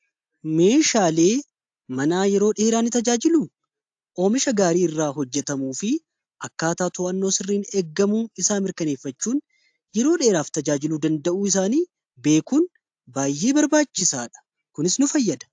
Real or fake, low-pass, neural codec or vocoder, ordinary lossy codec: real; 9.9 kHz; none; AAC, 64 kbps